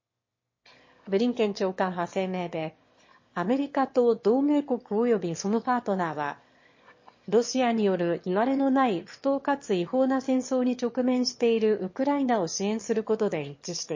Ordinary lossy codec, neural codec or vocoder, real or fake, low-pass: MP3, 32 kbps; autoencoder, 22.05 kHz, a latent of 192 numbers a frame, VITS, trained on one speaker; fake; 7.2 kHz